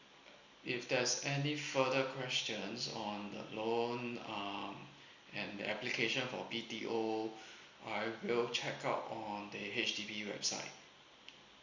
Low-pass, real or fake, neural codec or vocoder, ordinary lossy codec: 7.2 kHz; real; none; Opus, 64 kbps